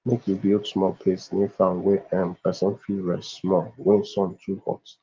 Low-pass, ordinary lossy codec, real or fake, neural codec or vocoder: 7.2 kHz; Opus, 32 kbps; real; none